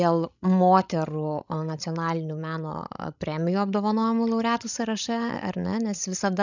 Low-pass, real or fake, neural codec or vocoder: 7.2 kHz; fake; codec, 16 kHz, 16 kbps, FreqCodec, larger model